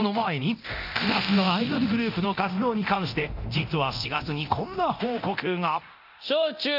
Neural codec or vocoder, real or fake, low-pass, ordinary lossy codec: codec, 24 kHz, 0.9 kbps, DualCodec; fake; 5.4 kHz; none